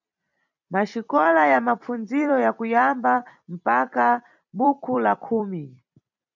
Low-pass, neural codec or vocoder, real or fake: 7.2 kHz; vocoder, 44.1 kHz, 128 mel bands every 256 samples, BigVGAN v2; fake